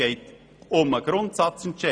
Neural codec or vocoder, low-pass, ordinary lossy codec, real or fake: none; 9.9 kHz; none; real